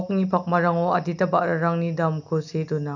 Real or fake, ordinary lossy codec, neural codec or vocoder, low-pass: real; none; none; 7.2 kHz